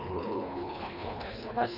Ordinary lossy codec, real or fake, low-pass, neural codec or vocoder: none; fake; 5.4 kHz; codec, 24 kHz, 1.5 kbps, HILCodec